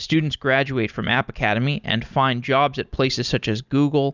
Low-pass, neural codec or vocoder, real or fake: 7.2 kHz; none; real